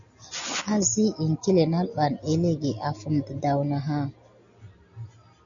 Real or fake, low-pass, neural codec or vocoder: real; 7.2 kHz; none